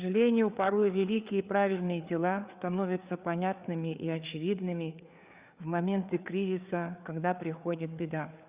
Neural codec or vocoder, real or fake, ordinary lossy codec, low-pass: codec, 16 kHz, 4 kbps, FreqCodec, larger model; fake; Opus, 64 kbps; 3.6 kHz